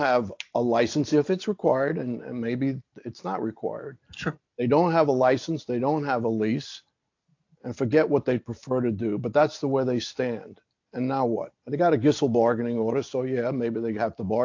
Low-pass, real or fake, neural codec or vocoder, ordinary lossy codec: 7.2 kHz; real; none; AAC, 48 kbps